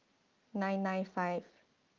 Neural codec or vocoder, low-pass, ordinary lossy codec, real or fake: none; 7.2 kHz; Opus, 32 kbps; real